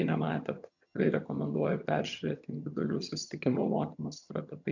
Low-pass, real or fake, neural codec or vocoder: 7.2 kHz; fake; vocoder, 22.05 kHz, 80 mel bands, HiFi-GAN